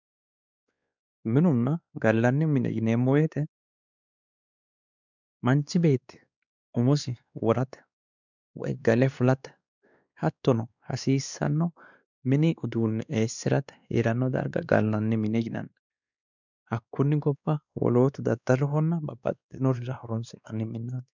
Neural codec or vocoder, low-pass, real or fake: codec, 16 kHz, 2 kbps, X-Codec, WavLM features, trained on Multilingual LibriSpeech; 7.2 kHz; fake